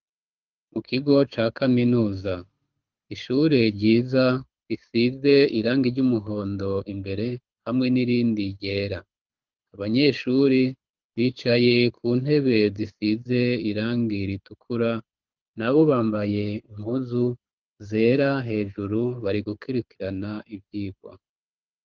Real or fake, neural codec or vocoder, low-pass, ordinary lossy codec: fake; codec, 24 kHz, 6 kbps, HILCodec; 7.2 kHz; Opus, 32 kbps